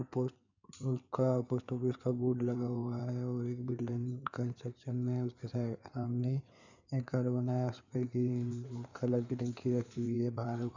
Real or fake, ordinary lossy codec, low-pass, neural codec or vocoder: fake; none; 7.2 kHz; codec, 16 kHz in and 24 kHz out, 2.2 kbps, FireRedTTS-2 codec